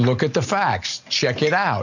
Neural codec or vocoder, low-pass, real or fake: none; 7.2 kHz; real